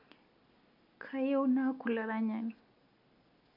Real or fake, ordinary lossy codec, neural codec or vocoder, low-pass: real; none; none; 5.4 kHz